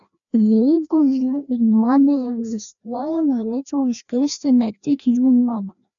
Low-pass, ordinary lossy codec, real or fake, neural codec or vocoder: 7.2 kHz; MP3, 96 kbps; fake; codec, 16 kHz, 1 kbps, FreqCodec, larger model